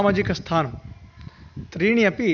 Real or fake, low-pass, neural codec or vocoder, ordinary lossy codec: real; 7.2 kHz; none; none